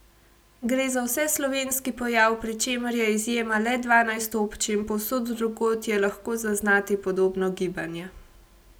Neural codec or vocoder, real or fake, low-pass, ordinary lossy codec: none; real; none; none